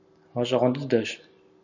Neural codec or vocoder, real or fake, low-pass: none; real; 7.2 kHz